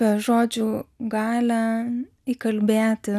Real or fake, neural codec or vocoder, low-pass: real; none; 14.4 kHz